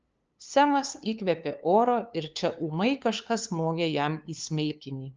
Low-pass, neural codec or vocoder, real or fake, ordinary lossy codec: 7.2 kHz; codec, 16 kHz, 2 kbps, FunCodec, trained on LibriTTS, 25 frames a second; fake; Opus, 24 kbps